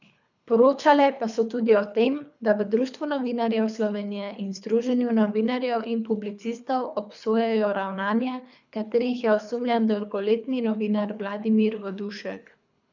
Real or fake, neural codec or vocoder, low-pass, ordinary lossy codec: fake; codec, 24 kHz, 3 kbps, HILCodec; 7.2 kHz; none